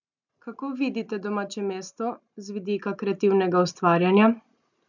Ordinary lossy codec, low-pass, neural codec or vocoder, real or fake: none; 7.2 kHz; none; real